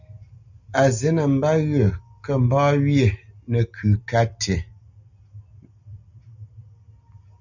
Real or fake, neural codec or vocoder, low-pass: real; none; 7.2 kHz